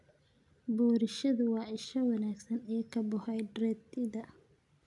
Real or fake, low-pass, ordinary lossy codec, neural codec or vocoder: real; 10.8 kHz; none; none